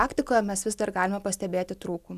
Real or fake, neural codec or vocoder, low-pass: real; none; 14.4 kHz